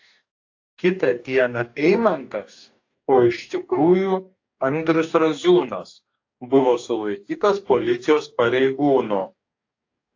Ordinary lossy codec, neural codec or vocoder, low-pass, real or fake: AAC, 48 kbps; codec, 44.1 kHz, 2.6 kbps, DAC; 7.2 kHz; fake